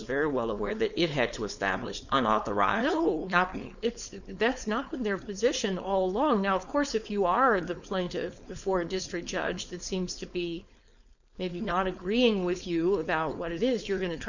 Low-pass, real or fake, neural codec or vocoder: 7.2 kHz; fake; codec, 16 kHz, 4.8 kbps, FACodec